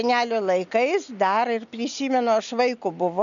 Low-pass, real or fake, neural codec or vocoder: 7.2 kHz; real; none